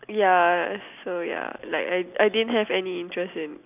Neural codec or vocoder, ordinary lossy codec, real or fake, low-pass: none; none; real; 3.6 kHz